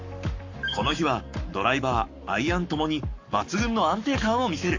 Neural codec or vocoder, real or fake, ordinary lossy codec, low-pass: codec, 44.1 kHz, 7.8 kbps, Pupu-Codec; fake; AAC, 48 kbps; 7.2 kHz